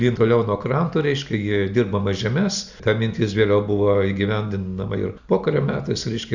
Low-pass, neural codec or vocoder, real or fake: 7.2 kHz; none; real